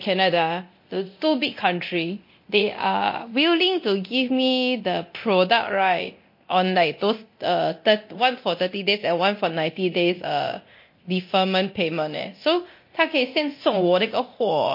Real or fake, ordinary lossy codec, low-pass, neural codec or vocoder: fake; MP3, 32 kbps; 5.4 kHz; codec, 24 kHz, 0.9 kbps, DualCodec